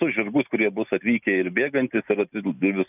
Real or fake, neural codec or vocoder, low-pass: real; none; 3.6 kHz